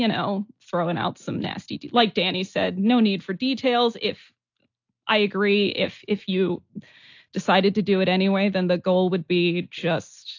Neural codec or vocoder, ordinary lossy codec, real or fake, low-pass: none; AAC, 48 kbps; real; 7.2 kHz